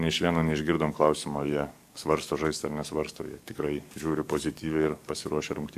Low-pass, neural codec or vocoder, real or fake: 14.4 kHz; codec, 44.1 kHz, 7.8 kbps, DAC; fake